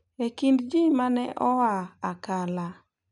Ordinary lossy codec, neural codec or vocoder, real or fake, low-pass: none; none; real; 10.8 kHz